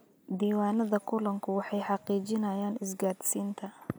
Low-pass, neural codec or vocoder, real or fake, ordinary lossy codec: none; none; real; none